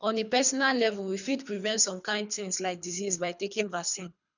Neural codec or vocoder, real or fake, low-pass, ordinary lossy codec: codec, 24 kHz, 3 kbps, HILCodec; fake; 7.2 kHz; none